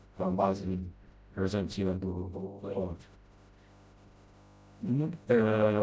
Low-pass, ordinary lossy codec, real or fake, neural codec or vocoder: none; none; fake; codec, 16 kHz, 0.5 kbps, FreqCodec, smaller model